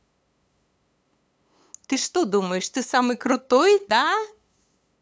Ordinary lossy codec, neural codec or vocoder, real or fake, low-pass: none; codec, 16 kHz, 8 kbps, FunCodec, trained on LibriTTS, 25 frames a second; fake; none